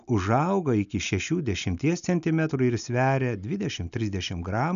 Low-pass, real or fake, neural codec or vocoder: 7.2 kHz; real; none